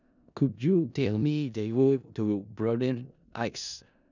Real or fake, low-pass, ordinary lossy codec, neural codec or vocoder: fake; 7.2 kHz; none; codec, 16 kHz in and 24 kHz out, 0.4 kbps, LongCat-Audio-Codec, four codebook decoder